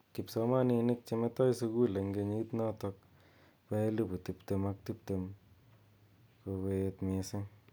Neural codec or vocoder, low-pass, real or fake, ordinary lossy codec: none; none; real; none